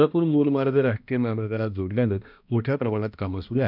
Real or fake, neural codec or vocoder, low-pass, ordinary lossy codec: fake; codec, 16 kHz, 1 kbps, X-Codec, HuBERT features, trained on balanced general audio; 5.4 kHz; none